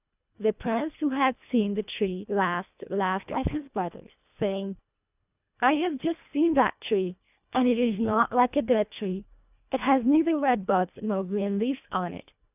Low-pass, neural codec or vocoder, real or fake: 3.6 kHz; codec, 24 kHz, 1.5 kbps, HILCodec; fake